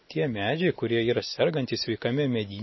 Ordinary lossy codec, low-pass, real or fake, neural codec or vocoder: MP3, 24 kbps; 7.2 kHz; real; none